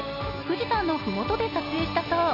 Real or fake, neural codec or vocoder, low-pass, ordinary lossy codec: real; none; 5.4 kHz; none